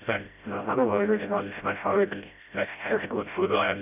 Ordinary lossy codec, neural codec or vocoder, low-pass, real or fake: none; codec, 16 kHz, 0.5 kbps, FreqCodec, smaller model; 3.6 kHz; fake